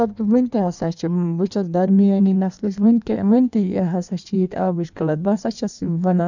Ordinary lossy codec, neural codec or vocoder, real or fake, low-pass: none; codec, 16 kHz in and 24 kHz out, 1.1 kbps, FireRedTTS-2 codec; fake; 7.2 kHz